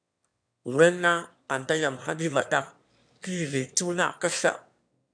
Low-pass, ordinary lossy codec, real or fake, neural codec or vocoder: 9.9 kHz; MP3, 96 kbps; fake; autoencoder, 22.05 kHz, a latent of 192 numbers a frame, VITS, trained on one speaker